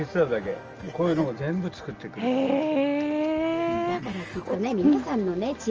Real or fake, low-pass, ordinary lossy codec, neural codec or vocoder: real; 7.2 kHz; Opus, 24 kbps; none